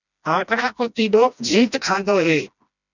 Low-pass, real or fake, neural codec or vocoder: 7.2 kHz; fake; codec, 16 kHz, 1 kbps, FreqCodec, smaller model